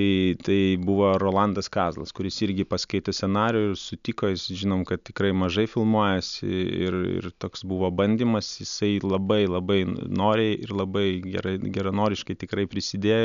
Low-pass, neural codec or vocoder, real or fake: 7.2 kHz; none; real